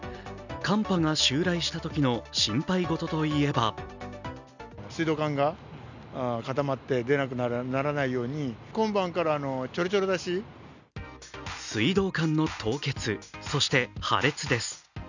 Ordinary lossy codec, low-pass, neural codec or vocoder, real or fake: none; 7.2 kHz; none; real